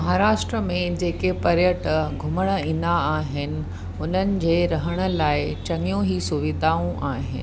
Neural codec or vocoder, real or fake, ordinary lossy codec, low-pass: none; real; none; none